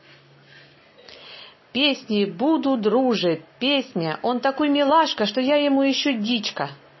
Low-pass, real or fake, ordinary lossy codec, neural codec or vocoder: 7.2 kHz; real; MP3, 24 kbps; none